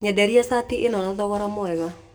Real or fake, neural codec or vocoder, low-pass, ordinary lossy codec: fake; codec, 44.1 kHz, 7.8 kbps, DAC; none; none